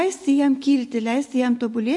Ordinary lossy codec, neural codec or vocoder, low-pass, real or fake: MP3, 48 kbps; none; 10.8 kHz; real